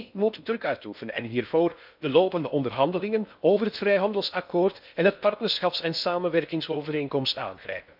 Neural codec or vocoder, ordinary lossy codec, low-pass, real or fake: codec, 16 kHz in and 24 kHz out, 0.6 kbps, FocalCodec, streaming, 4096 codes; none; 5.4 kHz; fake